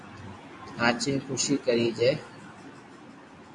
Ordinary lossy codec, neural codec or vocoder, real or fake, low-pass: AAC, 48 kbps; none; real; 10.8 kHz